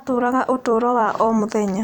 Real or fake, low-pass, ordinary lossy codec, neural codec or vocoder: fake; 19.8 kHz; none; vocoder, 44.1 kHz, 128 mel bands every 256 samples, BigVGAN v2